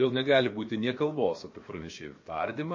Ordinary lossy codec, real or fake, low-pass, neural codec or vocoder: MP3, 32 kbps; fake; 7.2 kHz; codec, 16 kHz, about 1 kbps, DyCAST, with the encoder's durations